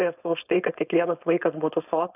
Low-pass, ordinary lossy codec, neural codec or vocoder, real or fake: 3.6 kHz; AAC, 32 kbps; codec, 16 kHz, 4.8 kbps, FACodec; fake